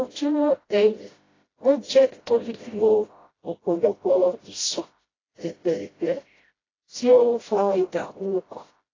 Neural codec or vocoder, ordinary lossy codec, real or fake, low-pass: codec, 16 kHz, 0.5 kbps, FreqCodec, smaller model; AAC, 32 kbps; fake; 7.2 kHz